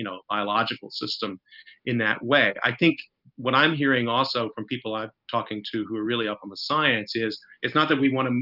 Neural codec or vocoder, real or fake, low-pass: none; real; 5.4 kHz